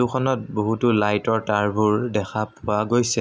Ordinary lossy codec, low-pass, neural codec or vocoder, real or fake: none; none; none; real